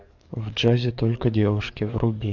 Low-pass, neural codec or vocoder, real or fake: 7.2 kHz; codec, 44.1 kHz, 7.8 kbps, DAC; fake